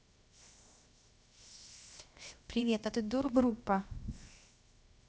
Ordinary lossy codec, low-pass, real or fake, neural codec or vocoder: none; none; fake; codec, 16 kHz, 0.7 kbps, FocalCodec